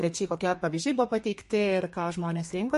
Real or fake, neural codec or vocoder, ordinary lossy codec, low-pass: fake; codec, 32 kHz, 1.9 kbps, SNAC; MP3, 48 kbps; 14.4 kHz